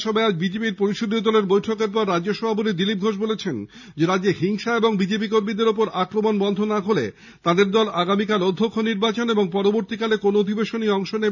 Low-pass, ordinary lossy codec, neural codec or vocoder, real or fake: 7.2 kHz; none; none; real